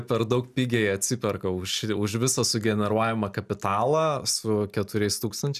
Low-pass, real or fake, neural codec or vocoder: 14.4 kHz; real; none